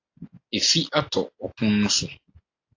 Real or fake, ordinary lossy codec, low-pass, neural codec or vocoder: real; AAC, 48 kbps; 7.2 kHz; none